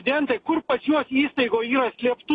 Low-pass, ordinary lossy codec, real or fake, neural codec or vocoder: 9.9 kHz; AAC, 32 kbps; real; none